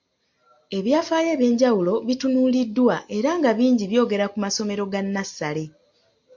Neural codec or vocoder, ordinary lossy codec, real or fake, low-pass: none; MP3, 48 kbps; real; 7.2 kHz